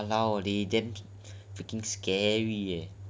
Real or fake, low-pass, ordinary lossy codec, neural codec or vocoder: real; none; none; none